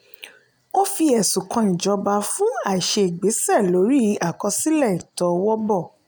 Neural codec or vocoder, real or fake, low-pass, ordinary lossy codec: none; real; none; none